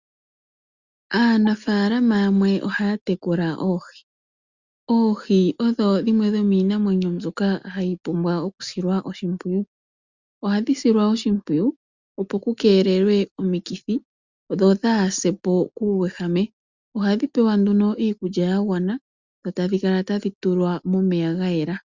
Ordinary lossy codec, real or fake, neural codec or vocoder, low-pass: Opus, 64 kbps; real; none; 7.2 kHz